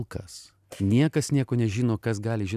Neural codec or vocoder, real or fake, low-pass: none; real; 14.4 kHz